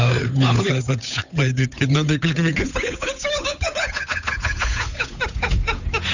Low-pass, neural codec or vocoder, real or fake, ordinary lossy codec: 7.2 kHz; codec, 16 kHz, 8 kbps, FunCodec, trained on Chinese and English, 25 frames a second; fake; none